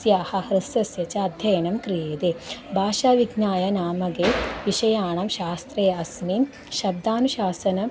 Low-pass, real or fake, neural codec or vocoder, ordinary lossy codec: none; real; none; none